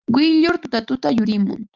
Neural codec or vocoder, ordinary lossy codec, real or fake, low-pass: none; Opus, 24 kbps; real; 7.2 kHz